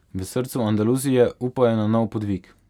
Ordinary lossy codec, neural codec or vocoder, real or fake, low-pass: none; none; real; 19.8 kHz